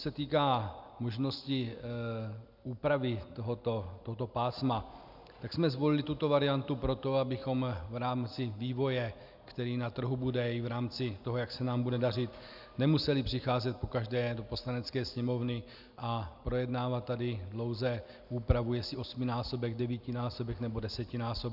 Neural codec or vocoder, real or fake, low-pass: none; real; 5.4 kHz